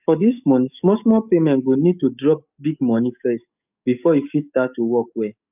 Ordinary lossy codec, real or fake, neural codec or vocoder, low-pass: none; fake; codec, 44.1 kHz, 7.8 kbps, DAC; 3.6 kHz